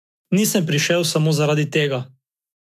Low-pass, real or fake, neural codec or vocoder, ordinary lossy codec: 14.4 kHz; fake; autoencoder, 48 kHz, 128 numbers a frame, DAC-VAE, trained on Japanese speech; none